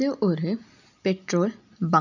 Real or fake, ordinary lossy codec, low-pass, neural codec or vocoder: real; none; 7.2 kHz; none